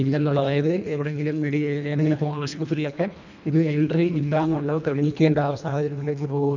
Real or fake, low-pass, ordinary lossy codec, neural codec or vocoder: fake; 7.2 kHz; none; codec, 24 kHz, 1.5 kbps, HILCodec